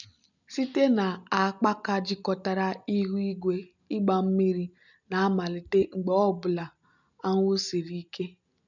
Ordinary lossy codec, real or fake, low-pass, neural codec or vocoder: none; real; 7.2 kHz; none